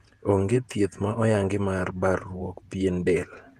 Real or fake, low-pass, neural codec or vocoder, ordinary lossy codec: fake; 14.4 kHz; vocoder, 48 kHz, 128 mel bands, Vocos; Opus, 32 kbps